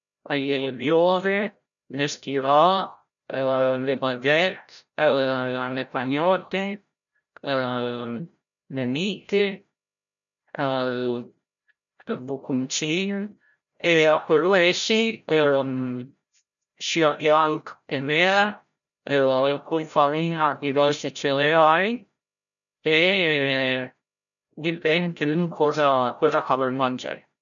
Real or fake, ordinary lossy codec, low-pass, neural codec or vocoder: fake; none; 7.2 kHz; codec, 16 kHz, 0.5 kbps, FreqCodec, larger model